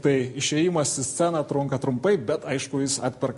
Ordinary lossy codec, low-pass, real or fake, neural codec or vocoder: MP3, 48 kbps; 14.4 kHz; real; none